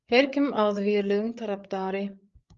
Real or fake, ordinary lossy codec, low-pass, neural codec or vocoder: fake; Opus, 32 kbps; 7.2 kHz; codec, 16 kHz, 8 kbps, FreqCodec, larger model